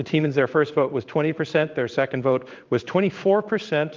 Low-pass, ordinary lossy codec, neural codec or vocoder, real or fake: 7.2 kHz; Opus, 24 kbps; codec, 16 kHz in and 24 kHz out, 1 kbps, XY-Tokenizer; fake